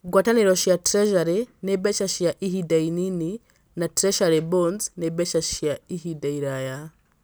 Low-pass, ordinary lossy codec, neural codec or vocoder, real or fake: none; none; none; real